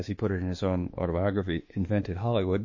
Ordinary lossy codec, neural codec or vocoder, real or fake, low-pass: MP3, 32 kbps; autoencoder, 48 kHz, 32 numbers a frame, DAC-VAE, trained on Japanese speech; fake; 7.2 kHz